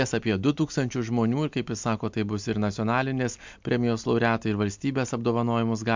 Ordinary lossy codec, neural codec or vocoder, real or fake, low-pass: MP3, 64 kbps; none; real; 7.2 kHz